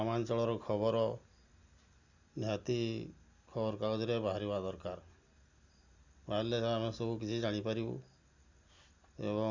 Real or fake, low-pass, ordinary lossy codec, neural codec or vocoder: real; 7.2 kHz; Opus, 64 kbps; none